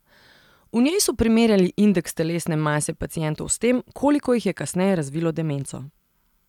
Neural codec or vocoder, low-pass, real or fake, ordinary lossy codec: none; 19.8 kHz; real; none